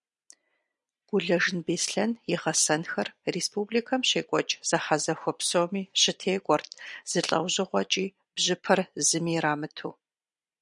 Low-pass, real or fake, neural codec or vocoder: 10.8 kHz; fake; vocoder, 44.1 kHz, 128 mel bands every 256 samples, BigVGAN v2